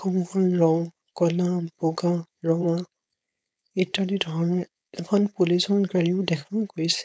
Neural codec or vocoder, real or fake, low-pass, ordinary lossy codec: codec, 16 kHz, 4.8 kbps, FACodec; fake; none; none